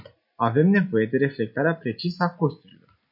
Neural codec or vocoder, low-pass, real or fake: none; 5.4 kHz; real